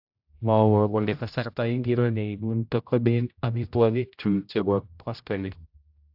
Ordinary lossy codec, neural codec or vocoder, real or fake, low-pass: AAC, 48 kbps; codec, 16 kHz, 0.5 kbps, X-Codec, HuBERT features, trained on general audio; fake; 5.4 kHz